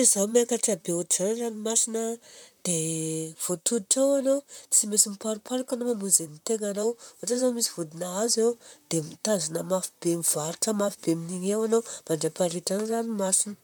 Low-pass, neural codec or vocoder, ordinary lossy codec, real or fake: none; vocoder, 44.1 kHz, 128 mel bands, Pupu-Vocoder; none; fake